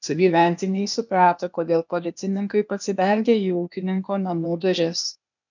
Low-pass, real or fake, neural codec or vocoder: 7.2 kHz; fake; codec, 16 kHz, 0.8 kbps, ZipCodec